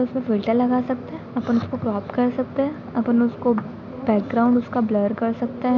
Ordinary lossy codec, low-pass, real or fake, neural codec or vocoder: none; 7.2 kHz; real; none